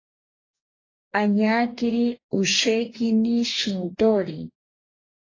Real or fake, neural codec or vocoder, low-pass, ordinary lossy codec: fake; codec, 44.1 kHz, 2.6 kbps, DAC; 7.2 kHz; AAC, 32 kbps